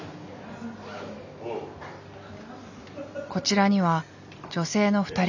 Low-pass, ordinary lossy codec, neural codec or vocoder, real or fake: 7.2 kHz; none; none; real